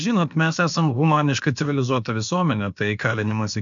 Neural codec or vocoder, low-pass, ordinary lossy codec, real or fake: codec, 16 kHz, about 1 kbps, DyCAST, with the encoder's durations; 7.2 kHz; MP3, 96 kbps; fake